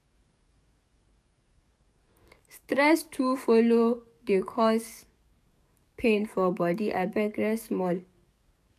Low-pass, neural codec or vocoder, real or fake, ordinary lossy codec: 14.4 kHz; codec, 44.1 kHz, 7.8 kbps, DAC; fake; none